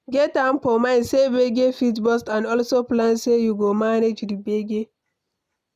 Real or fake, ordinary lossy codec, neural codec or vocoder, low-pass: real; none; none; 14.4 kHz